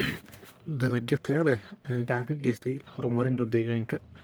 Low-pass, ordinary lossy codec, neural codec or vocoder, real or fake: none; none; codec, 44.1 kHz, 1.7 kbps, Pupu-Codec; fake